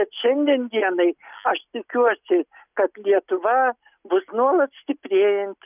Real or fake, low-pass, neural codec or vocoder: real; 3.6 kHz; none